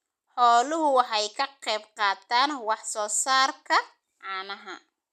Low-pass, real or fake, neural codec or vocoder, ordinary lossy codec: 14.4 kHz; real; none; none